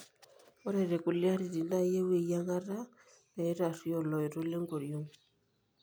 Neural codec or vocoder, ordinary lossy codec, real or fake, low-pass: none; none; real; none